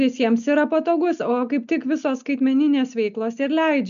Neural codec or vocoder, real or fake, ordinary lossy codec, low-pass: none; real; MP3, 96 kbps; 7.2 kHz